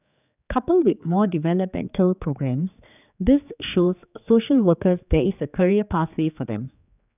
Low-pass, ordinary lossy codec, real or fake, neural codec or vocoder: 3.6 kHz; none; fake; codec, 16 kHz, 4 kbps, X-Codec, HuBERT features, trained on general audio